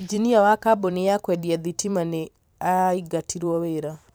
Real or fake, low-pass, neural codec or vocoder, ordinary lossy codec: fake; none; vocoder, 44.1 kHz, 128 mel bands every 512 samples, BigVGAN v2; none